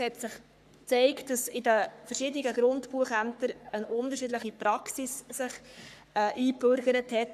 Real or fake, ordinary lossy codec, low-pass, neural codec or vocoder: fake; none; 14.4 kHz; codec, 44.1 kHz, 3.4 kbps, Pupu-Codec